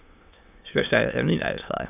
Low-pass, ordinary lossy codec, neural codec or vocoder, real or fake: 3.6 kHz; none; autoencoder, 22.05 kHz, a latent of 192 numbers a frame, VITS, trained on many speakers; fake